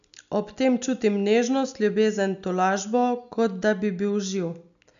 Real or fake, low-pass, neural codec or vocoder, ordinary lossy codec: real; 7.2 kHz; none; none